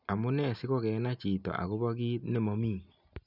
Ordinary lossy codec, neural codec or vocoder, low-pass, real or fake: none; none; 5.4 kHz; real